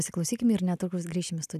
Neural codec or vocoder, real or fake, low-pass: none; real; 14.4 kHz